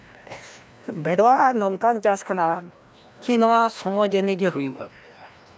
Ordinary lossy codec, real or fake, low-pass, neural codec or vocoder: none; fake; none; codec, 16 kHz, 1 kbps, FreqCodec, larger model